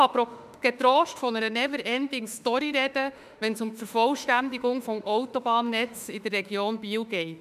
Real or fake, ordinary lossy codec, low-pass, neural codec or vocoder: fake; none; 14.4 kHz; autoencoder, 48 kHz, 32 numbers a frame, DAC-VAE, trained on Japanese speech